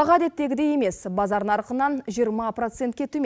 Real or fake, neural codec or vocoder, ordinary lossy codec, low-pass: real; none; none; none